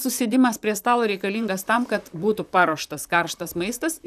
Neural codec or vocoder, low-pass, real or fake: vocoder, 44.1 kHz, 128 mel bands, Pupu-Vocoder; 14.4 kHz; fake